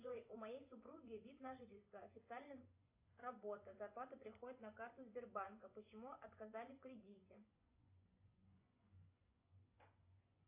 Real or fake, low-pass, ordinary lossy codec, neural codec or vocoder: fake; 3.6 kHz; AAC, 24 kbps; vocoder, 24 kHz, 100 mel bands, Vocos